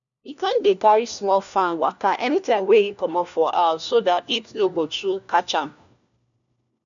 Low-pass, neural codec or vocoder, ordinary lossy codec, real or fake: 7.2 kHz; codec, 16 kHz, 1 kbps, FunCodec, trained on LibriTTS, 50 frames a second; none; fake